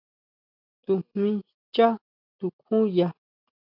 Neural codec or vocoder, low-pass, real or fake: none; 5.4 kHz; real